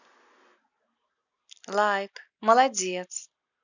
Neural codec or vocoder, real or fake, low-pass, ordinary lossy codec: none; real; 7.2 kHz; none